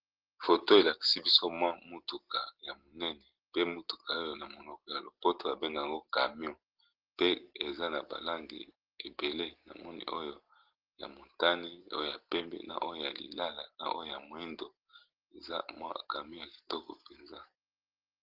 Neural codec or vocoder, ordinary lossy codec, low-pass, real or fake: none; Opus, 16 kbps; 5.4 kHz; real